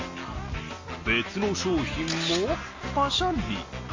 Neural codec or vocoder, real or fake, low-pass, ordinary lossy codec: none; real; 7.2 kHz; MP3, 32 kbps